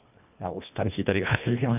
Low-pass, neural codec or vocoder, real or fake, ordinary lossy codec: 3.6 kHz; codec, 24 kHz, 1.5 kbps, HILCodec; fake; none